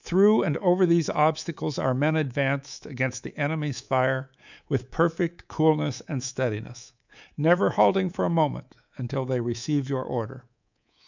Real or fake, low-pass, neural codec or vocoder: fake; 7.2 kHz; codec, 24 kHz, 3.1 kbps, DualCodec